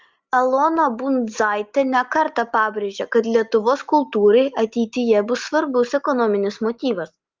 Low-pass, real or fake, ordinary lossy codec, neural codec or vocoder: 7.2 kHz; real; Opus, 32 kbps; none